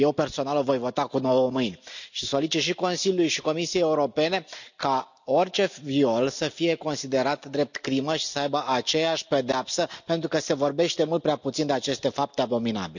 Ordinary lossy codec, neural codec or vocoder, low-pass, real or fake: none; none; 7.2 kHz; real